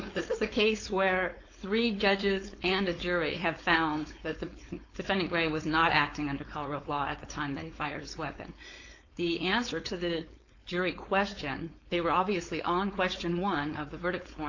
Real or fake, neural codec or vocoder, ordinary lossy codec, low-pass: fake; codec, 16 kHz, 4.8 kbps, FACodec; AAC, 48 kbps; 7.2 kHz